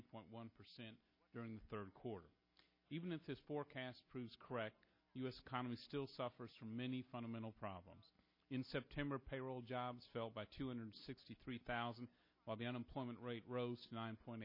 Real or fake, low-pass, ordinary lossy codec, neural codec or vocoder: real; 5.4 kHz; MP3, 24 kbps; none